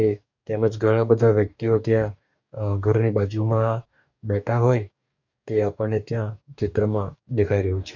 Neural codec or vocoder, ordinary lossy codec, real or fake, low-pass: codec, 44.1 kHz, 2.6 kbps, DAC; none; fake; 7.2 kHz